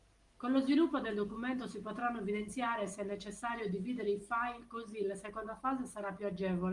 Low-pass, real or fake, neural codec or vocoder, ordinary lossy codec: 10.8 kHz; real; none; Opus, 24 kbps